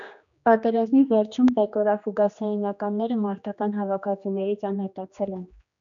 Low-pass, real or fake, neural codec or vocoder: 7.2 kHz; fake; codec, 16 kHz, 2 kbps, X-Codec, HuBERT features, trained on general audio